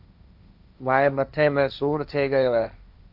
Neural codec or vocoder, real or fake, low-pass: codec, 16 kHz, 1.1 kbps, Voila-Tokenizer; fake; 5.4 kHz